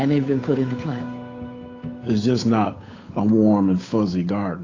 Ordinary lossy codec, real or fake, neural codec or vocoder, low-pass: AAC, 32 kbps; fake; codec, 16 kHz, 8 kbps, FunCodec, trained on Chinese and English, 25 frames a second; 7.2 kHz